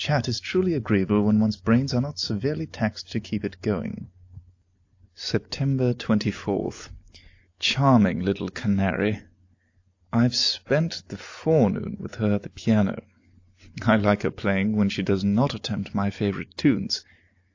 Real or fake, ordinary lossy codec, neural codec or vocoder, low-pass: real; AAC, 48 kbps; none; 7.2 kHz